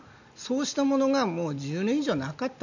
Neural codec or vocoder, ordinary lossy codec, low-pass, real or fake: none; none; 7.2 kHz; real